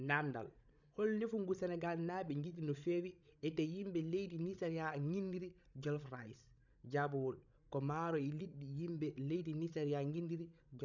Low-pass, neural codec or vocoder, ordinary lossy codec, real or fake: 7.2 kHz; codec, 16 kHz, 16 kbps, FreqCodec, larger model; none; fake